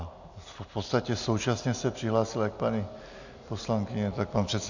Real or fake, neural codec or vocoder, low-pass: real; none; 7.2 kHz